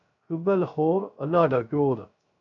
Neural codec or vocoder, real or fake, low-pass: codec, 16 kHz, 0.3 kbps, FocalCodec; fake; 7.2 kHz